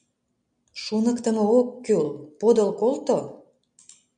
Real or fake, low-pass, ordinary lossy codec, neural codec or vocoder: real; 9.9 kHz; MP3, 96 kbps; none